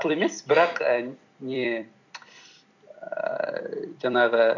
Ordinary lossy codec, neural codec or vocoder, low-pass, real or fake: none; vocoder, 44.1 kHz, 128 mel bands every 256 samples, BigVGAN v2; 7.2 kHz; fake